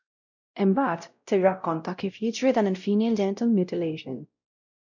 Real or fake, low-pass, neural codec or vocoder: fake; 7.2 kHz; codec, 16 kHz, 0.5 kbps, X-Codec, WavLM features, trained on Multilingual LibriSpeech